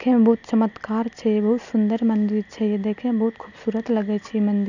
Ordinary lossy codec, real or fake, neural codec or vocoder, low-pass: none; real; none; 7.2 kHz